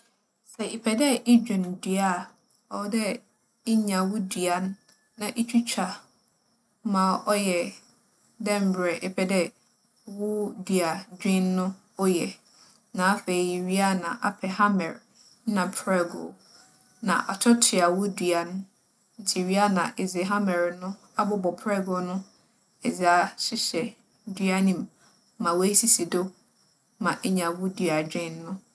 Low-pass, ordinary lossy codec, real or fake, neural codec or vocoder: none; none; real; none